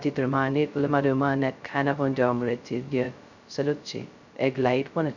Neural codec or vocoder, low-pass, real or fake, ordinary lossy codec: codec, 16 kHz, 0.2 kbps, FocalCodec; 7.2 kHz; fake; none